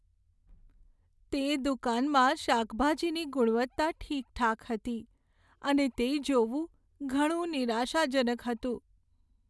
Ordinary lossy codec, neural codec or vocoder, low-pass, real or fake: none; none; none; real